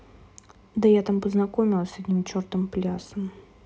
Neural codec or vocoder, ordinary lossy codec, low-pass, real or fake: none; none; none; real